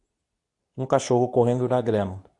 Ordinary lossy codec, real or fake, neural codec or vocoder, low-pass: AAC, 64 kbps; fake; codec, 24 kHz, 0.9 kbps, WavTokenizer, medium speech release version 2; 10.8 kHz